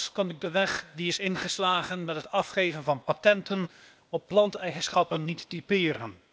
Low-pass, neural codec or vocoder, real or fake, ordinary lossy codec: none; codec, 16 kHz, 0.8 kbps, ZipCodec; fake; none